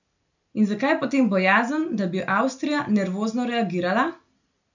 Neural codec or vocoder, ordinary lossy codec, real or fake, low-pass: none; none; real; 7.2 kHz